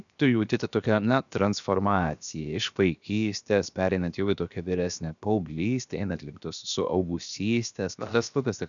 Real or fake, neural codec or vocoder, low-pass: fake; codec, 16 kHz, 0.7 kbps, FocalCodec; 7.2 kHz